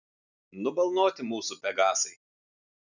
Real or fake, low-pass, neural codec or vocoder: real; 7.2 kHz; none